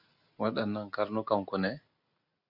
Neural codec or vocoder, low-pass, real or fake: none; 5.4 kHz; real